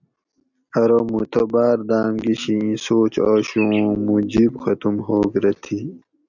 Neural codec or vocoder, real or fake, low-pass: none; real; 7.2 kHz